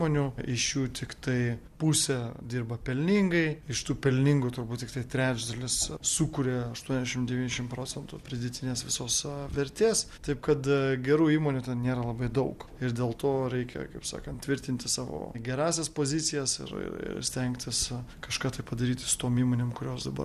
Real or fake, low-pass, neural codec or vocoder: fake; 14.4 kHz; vocoder, 44.1 kHz, 128 mel bands every 256 samples, BigVGAN v2